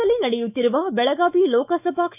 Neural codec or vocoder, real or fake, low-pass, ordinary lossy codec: autoencoder, 48 kHz, 128 numbers a frame, DAC-VAE, trained on Japanese speech; fake; 3.6 kHz; none